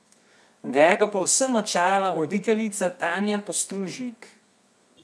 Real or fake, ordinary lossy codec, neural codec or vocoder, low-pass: fake; none; codec, 24 kHz, 0.9 kbps, WavTokenizer, medium music audio release; none